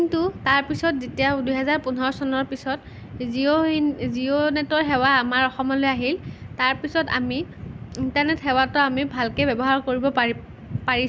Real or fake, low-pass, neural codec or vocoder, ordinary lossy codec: real; none; none; none